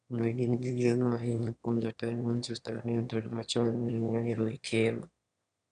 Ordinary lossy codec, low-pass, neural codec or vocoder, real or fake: none; 9.9 kHz; autoencoder, 22.05 kHz, a latent of 192 numbers a frame, VITS, trained on one speaker; fake